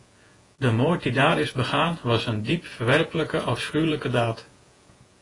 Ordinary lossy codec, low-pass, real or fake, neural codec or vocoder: AAC, 32 kbps; 10.8 kHz; fake; vocoder, 48 kHz, 128 mel bands, Vocos